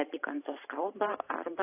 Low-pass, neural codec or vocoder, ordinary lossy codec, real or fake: 3.6 kHz; vocoder, 44.1 kHz, 128 mel bands every 512 samples, BigVGAN v2; AAC, 24 kbps; fake